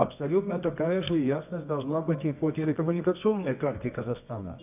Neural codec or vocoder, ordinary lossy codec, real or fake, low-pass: codec, 24 kHz, 0.9 kbps, WavTokenizer, medium music audio release; none; fake; 3.6 kHz